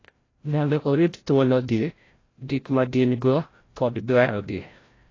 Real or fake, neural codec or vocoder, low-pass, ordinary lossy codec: fake; codec, 16 kHz, 0.5 kbps, FreqCodec, larger model; 7.2 kHz; AAC, 32 kbps